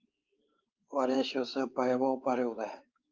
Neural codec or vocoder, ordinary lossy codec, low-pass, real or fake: codec, 16 kHz, 4 kbps, X-Codec, WavLM features, trained on Multilingual LibriSpeech; Opus, 24 kbps; 7.2 kHz; fake